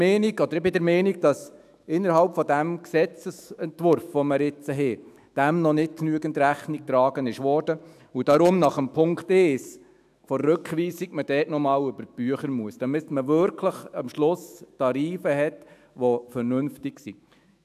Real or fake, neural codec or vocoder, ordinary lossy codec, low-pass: fake; autoencoder, 48 kHz, 128 numbers a frame, DAC-VAE, trained on Japanese speech; AAC, 96 kbps; 14.4 kHz